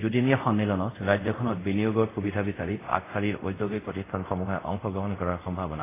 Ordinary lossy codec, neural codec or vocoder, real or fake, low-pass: AAC, 16 kbps; codec, 24 kHz, 0.5 kbps, DualCodec; fake; 3.6 kHz